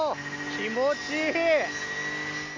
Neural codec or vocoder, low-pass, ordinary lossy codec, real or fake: none; 7.2 kHz; MP3, 48 kbps; real